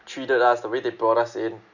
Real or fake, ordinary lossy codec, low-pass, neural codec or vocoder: real; none; 7.2 kHz; none